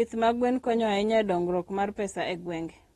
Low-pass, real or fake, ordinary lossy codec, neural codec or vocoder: 19.8 kHz; real; AAC, 32 kbps; none